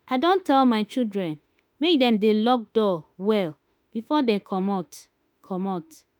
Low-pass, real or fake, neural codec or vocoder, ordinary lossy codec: none; fake; autoencoder, 48 kHz, 32 numbers a frame, DAC-VAE, trained on Japanese speech; none